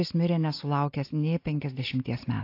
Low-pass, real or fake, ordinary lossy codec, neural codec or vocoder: 5.4 kHz; real; AAC, 32 kbps; none